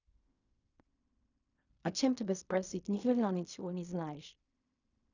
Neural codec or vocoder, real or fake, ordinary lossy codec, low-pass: codec, 16 kHz in and 24 kHz out, 0.4 kbps, LongCat-Audio-Codec, fine tuned four codebook decoder; fake; none; 7.2 kHz